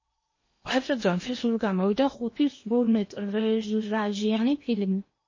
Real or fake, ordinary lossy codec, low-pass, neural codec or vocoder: fake; MP3, 32 kbps; 7.2 kHz; codec, 16 kHz in and 24 kHz out, 0.8 kbps, FocalCodec, streaming, 65536 codes